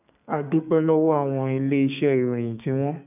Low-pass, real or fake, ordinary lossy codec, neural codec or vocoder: 3.6 kHz; fake; none; codec, 32 kHz, 1.9 kbps, SNAC